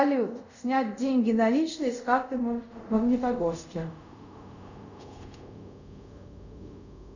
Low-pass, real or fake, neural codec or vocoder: 7.2 kHz; fake; codec, 24 kHz, 0.5 kbps, DualCodec